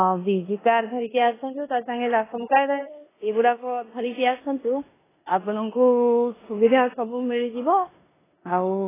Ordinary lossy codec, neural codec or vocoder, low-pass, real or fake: AAC, 16 kbps; codec, 16 kHz in and 24 kHz out, 0.9 kbps, LongCat-Audio-Codec, four codebook decoder; 3.6 kHz; fake